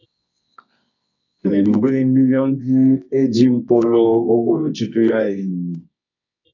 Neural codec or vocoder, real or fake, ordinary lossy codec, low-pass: codec, 24 kHz, 0.9 kbps, WavTokenizer, medium music audio release; fake; AAC, 48 kbps; 7.2 kHz